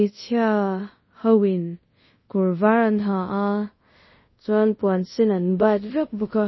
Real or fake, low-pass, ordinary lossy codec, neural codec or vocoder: fake; 7.2 kHz; MP3, 24 kbps; codec, 24 kHz, 0.5 kbps, DualCodec